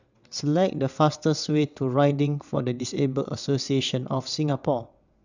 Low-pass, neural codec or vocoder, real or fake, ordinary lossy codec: 7.2 kHz; vocoder, 22.05 kHz, 80 mel bands, WaveNeXt; fake; none